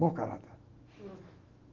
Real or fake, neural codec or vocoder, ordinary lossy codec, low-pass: fake; autoencoder, 48 kHz, 128 numbers a frame, DAC-VAE, trained on Japanese speech; Opus, 32 kbps; 7.2 kHz